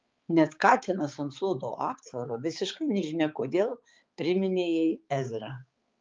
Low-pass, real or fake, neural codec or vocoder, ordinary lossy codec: 7.2 kHz; fake; codec, 16 kHz, 4 kbps, X-Codec, HuBERT features, trained on balanced general audio; Opus, 32 kbps